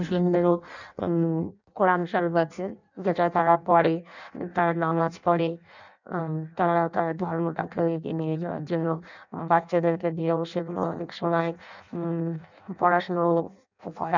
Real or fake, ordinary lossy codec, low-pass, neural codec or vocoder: fake; none; 7.2 kHz; codec, 16 kHz in and 24 kHz out, 0.6 kbps, FireRedTTS-2 codec